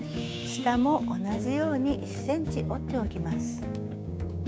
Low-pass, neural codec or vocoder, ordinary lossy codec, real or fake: none; codec, 16 kHz, 6 kbps, DAC; none; fake